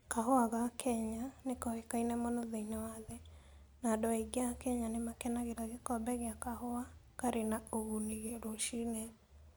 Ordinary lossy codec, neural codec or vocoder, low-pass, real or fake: none; none; none; real